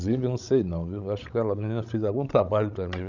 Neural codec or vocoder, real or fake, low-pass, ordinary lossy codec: codec, 16 kHz, 16 kbps, FreqCodec, larger model; fake; 7.2 kHz; none